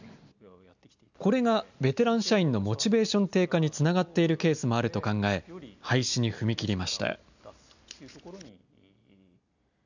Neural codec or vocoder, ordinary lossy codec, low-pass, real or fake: none; none; 7.2 kHz; real